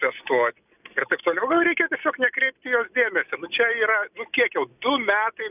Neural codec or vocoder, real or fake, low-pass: none; real; 3.6 kHz